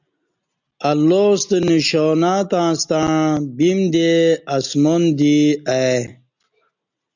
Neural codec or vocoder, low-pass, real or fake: none; 7.2 kHz; real